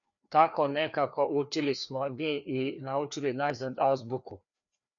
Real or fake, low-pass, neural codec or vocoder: fake; 7.2 kHz; codec, 16 kHz, 2 kbps, FreqCodec, larger model